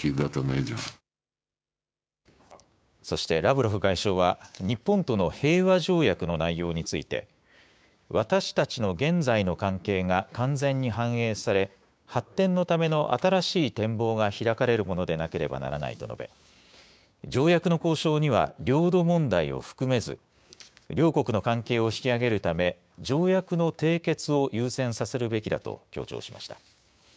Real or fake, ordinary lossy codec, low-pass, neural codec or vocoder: fake; none; none; codec, 16 kHz, 6 kbps, DAC